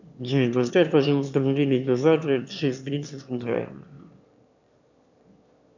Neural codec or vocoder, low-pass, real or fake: autoencoder, 22.05 kHz, a latent of 192 numbers a frame, VITS, trained on one speaker; 7.2 kHz; fake